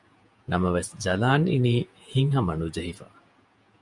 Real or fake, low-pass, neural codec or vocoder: fake; 10.8 kHz; vocoder, 48 kHz, 128 mel bands, Vocos